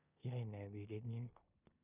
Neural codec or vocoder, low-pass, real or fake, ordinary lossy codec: codec, 16 kHz in and 24 kHz out, 0.9 kbps, LongCat-Audio-Codec, four codebook decoder; 3.6 kHz; fake; none